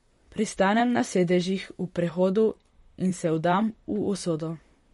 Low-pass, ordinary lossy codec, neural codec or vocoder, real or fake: 19.8 kHz; MP3, 48 kbps; vocoder, 44.1 kHz, 128 mel bands, Pupu-Vocoder; fake